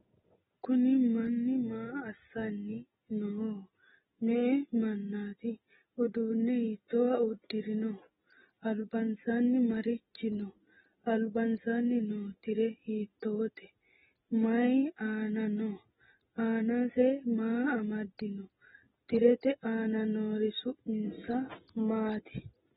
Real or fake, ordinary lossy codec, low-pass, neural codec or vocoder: real; AAC, 16 kbps; 10.8 kHz; none